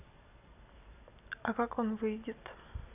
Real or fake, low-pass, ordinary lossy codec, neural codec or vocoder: real; 3.6 kHz; none; none